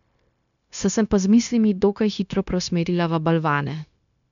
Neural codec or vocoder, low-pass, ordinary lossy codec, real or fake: codec, 16 kHz, 0.9 kbps, LongCat-Audio-Codec; 7.2 kHz; none; fake